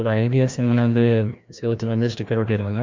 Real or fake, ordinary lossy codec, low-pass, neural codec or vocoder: fake; MP3, 64 kbps; 7.2 kHz; codec, 16 kHz, 1 kbps, FreqCodec, larger model